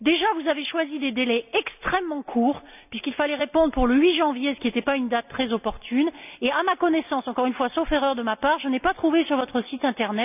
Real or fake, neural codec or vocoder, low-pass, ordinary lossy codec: real; none; 3.6 kHz; none